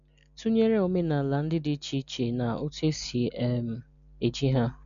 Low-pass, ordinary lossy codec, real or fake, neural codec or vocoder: 7.2 kHz; none; real; none